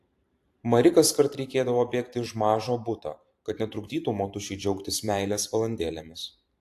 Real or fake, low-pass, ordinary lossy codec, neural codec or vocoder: fake; 14.4 kHz; AAC, 64 kbps; vocoder, 44.1 kHz, 128 mel bands every 512 samples, BigVGAN v2